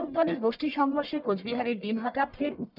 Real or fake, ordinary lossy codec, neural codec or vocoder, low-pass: fake; none; codec, 44.1 kHz, 1.7 kbps, Pupu-Codec; 5.4 kHz